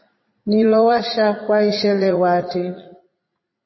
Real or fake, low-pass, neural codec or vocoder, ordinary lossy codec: fake; 7.2 kHz; vocoder, 44.1 kHz, 80 mel bands, Vocos; MP3, 24 kbps